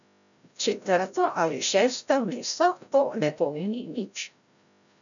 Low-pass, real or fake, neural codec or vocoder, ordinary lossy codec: 7.2 kHz; fake; codec, 16 kHz, 0.5 kbps, FreqCodec, larger model; none